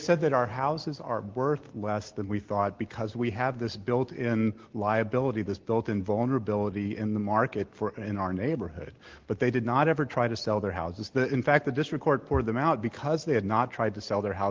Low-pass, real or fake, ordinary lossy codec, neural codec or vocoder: 7.2 kHz; real; Opus, 16 kbps; none